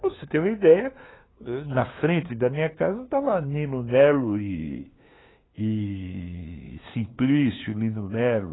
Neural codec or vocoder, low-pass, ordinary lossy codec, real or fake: codec, 16 kHz, 2 kbps, FunCodec, trained on LibriTTS, 25 frames a second; 7.2 kHz; AAC, 16 kbps; fake